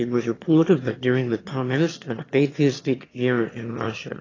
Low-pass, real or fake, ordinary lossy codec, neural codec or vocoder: 7.2 kHz; fake; AAC, 32 kbps; autoencoder, 22.05 kHz, a latent of 192 numbers a frame, VITS, trained on one speaker